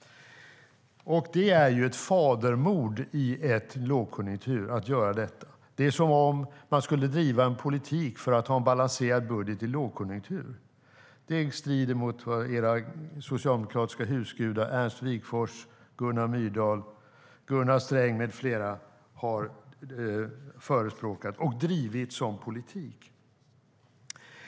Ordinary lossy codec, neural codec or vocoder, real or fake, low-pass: none; none; real; none